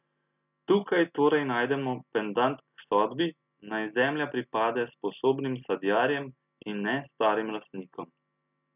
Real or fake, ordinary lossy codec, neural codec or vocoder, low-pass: real; none; none; 3.6 kHz